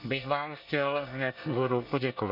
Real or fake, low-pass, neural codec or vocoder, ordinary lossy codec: fake; 5.4 kHz; codec, 24 kHz, 1 kbps, SNAC; none